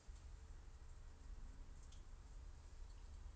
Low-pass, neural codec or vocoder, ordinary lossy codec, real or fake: none; none; none; real